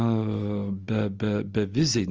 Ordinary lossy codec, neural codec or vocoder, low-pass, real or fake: Opus, 24 kbps; none; 7.2 kHz; real